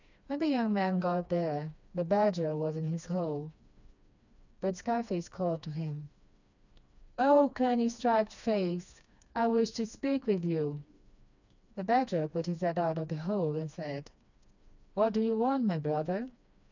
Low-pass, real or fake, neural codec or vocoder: 7.2 kHz; fake; codec, 16 kHz, 2 kbps, FreqCodec, smaller model